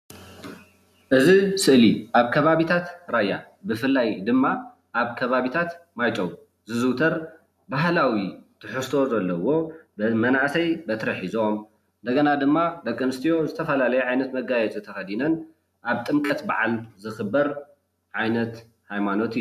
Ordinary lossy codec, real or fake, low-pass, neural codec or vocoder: MP3, 96 kbps; real; 14.4 kHz; none